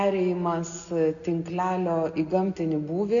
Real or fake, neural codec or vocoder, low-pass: real; none; 7.2 kHz